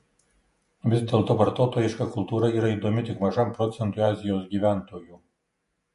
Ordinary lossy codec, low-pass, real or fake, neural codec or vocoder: MP3, 48 kbps; 14.4 kHz; fake; vocoder, 48 kHz, 128 mel bands, Vocos